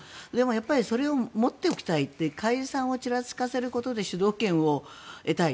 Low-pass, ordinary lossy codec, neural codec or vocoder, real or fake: none; none; none; real